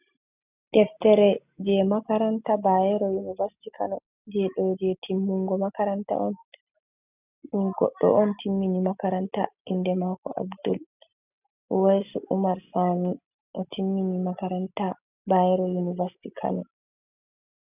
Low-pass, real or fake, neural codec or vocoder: 3.6 kHz; real; none